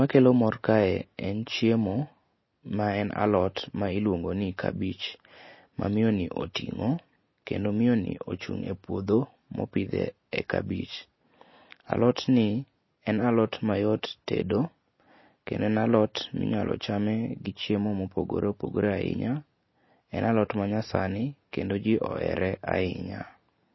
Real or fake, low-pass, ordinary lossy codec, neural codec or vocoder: real; 7.2 kHz; MP3, 24 kbps; none